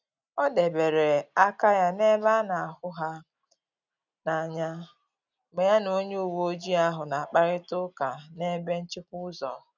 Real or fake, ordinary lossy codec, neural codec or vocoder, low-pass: real; none; none; 7.2 kHz